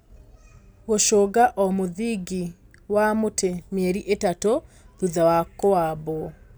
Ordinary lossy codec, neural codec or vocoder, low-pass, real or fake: none; none; none; real